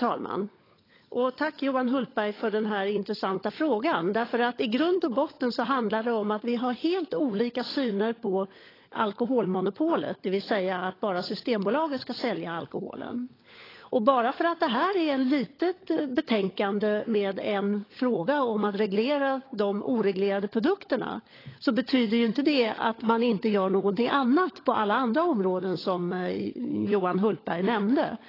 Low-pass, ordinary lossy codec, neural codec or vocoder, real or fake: 5.4 kHz; AAC, 24 kbps; codec, 16 kHz, 16 kbps, FunCodec, trained on LibriTTS, 50 frames a second; fake